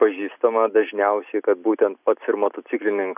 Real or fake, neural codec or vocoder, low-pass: real; none; 3.6 kHz